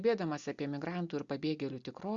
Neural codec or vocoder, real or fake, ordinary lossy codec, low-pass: none; real; Opus, 64 kbps; 7.2 kHz